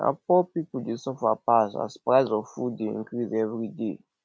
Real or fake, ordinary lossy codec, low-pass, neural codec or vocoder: real; none; none; none